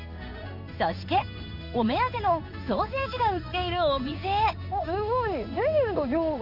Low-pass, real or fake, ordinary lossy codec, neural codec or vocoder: 5.4 kHz; fake; none; codec, 16 kHz in and 24 kHz out, 1 kbps, XY-Tokenizer